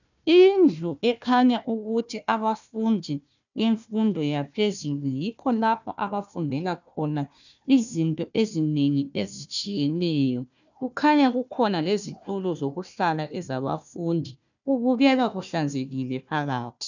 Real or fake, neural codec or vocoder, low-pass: fake; codec, 16 kHz, 1 kbps, FunCodec, trained on Chinese and English, 50 frames a second; 7.2 kHz